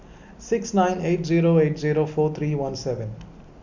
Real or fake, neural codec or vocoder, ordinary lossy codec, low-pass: real; none; none; 7.2 kHz